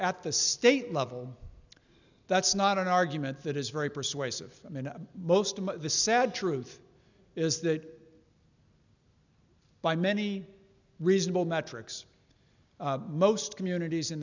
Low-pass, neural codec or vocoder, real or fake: 7.2 kHz; none; real